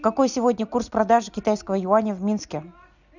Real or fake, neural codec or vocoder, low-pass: real; none; 7.2 kHz